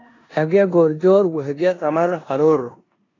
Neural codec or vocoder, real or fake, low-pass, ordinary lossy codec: codec, 16 kHz in and 24 kHz out, 0.9 kbps, LongCat-Audio-Codec, four codebook decoder; fake; 7.2 kHz; AAC, 32 kbps